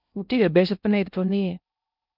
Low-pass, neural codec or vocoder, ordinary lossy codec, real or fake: 5.4 kHz; codec, 16 kHz in and 24 kHz out, 0.6 kbps, FocalCodec, streaming, 2048 codes; none; fake